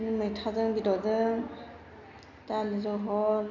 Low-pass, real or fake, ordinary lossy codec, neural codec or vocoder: 7.2 kHz; real; none; none